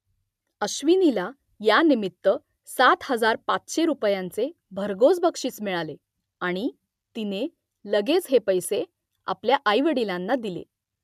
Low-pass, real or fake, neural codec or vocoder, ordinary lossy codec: 14.4 kHz; real; none; MP3, 96 kbps